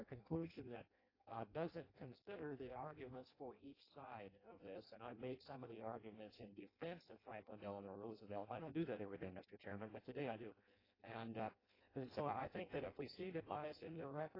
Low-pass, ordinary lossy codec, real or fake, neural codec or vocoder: 5.4 kHz; AAC, 24 kbps; fake; codec, 16 kHz in and 24 kHz out, 0.6 kbps, FireRedTTS-2 codec